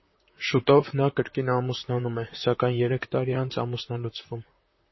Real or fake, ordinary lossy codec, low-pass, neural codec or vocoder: fake; MP3, 24 kbps; 7.2 kHz; vocoder, 44.1 kHz, 128 mel bands, Pupu-Vocoder